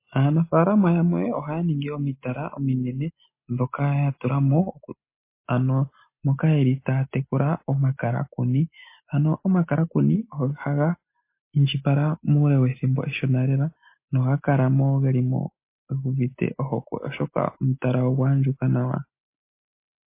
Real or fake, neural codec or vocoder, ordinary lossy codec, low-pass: real; none; MP3, 24 kbps; 3.6 kHz